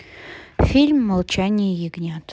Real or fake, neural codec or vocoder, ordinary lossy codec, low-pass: real; none; none; none